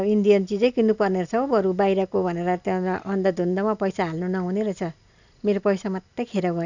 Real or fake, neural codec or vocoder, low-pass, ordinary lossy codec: real; none; 7.2 kHz; none